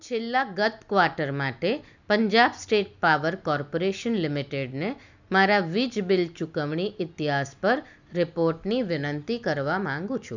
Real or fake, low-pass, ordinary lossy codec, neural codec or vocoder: real; 7.2 kHz; none; none